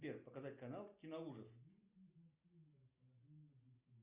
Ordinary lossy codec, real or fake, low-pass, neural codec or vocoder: Opus, 64 kbps; real; 3.6 kHz; none